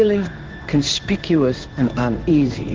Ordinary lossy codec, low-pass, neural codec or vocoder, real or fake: Opus, 16 kbps; 7.2 kHz; codec, 16 kHz in and 24 kHz out, 1 kbps, XY-Tokenizer; fake